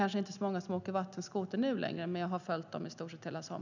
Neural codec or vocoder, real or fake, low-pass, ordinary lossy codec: none; real; 7.2 kHz; none